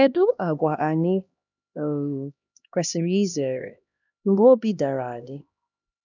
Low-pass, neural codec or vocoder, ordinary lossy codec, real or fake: 7.2 kHz; codec, 16 kHz, 1 kbps, X-Codec, HuBERT features, trained on LibriSpeech; none; fake